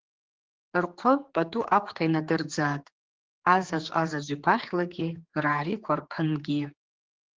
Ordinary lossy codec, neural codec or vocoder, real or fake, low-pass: Opus, 16 kbps; codec, 16 kHz, 4 kbps, X-Codec, HuBERT features, trained on general audio; fake; 7.2 kHz